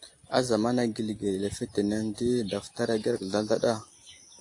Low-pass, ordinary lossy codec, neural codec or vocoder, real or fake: 10.8 kHz; AAC, 48 kbps; none; real